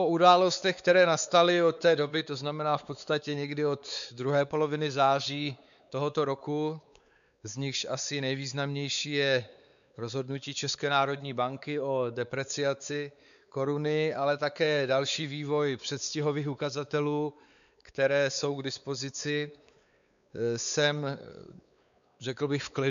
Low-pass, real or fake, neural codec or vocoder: 7.2 kHz; fake; codec, 16 kHz, 4 kbps, X-Codec, WavLM features, trained on Multilingual LibriSpeech